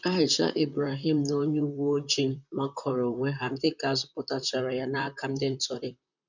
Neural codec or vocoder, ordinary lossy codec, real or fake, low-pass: vocoder, 44.1 kHz, 128 mel bands, Pupu-Vocoder; none; fake; 7.2 kHz